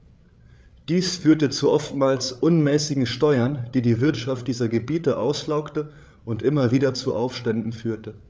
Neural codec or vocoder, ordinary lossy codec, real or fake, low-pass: codec, 16 kHz, 8 kbps, FreqCodec, larger model; none; fake; none